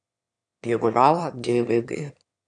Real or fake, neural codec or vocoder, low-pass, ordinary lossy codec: fake; autoencoder, 22.05 kHz, a latent of 192 numbers a frame, VITS, trained on one speaker; 9.9 kHz; MP3, 96 kbps